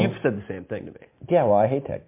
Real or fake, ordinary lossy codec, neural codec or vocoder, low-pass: real; MP3, 32 kbps; none; 3.6 kHz